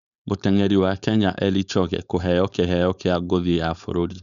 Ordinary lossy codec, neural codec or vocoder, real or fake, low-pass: none; codec, 16 kHz, 4.8 kbps, FACodec; fake; 7.2 kHz